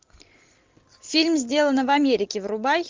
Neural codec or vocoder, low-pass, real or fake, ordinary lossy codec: none; 7.2 kHz; real; Opus, 32 kbps